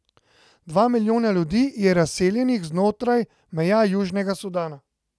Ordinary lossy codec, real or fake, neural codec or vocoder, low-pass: none; real; none; none